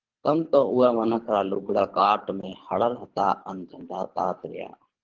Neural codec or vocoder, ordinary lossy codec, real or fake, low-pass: codec, 24 kHz, 3 kbps, HILCodec; Opus, 16 kbps; fake; 7.2 kHz